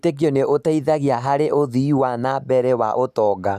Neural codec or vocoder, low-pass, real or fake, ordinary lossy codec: none; 14.4 kHz; real; none